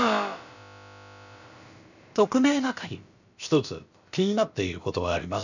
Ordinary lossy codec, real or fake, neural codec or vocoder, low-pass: none; fake; codec, 16 kHz, about 1 kbps, DyCAST, with the encoder's durations; 7.2 kHz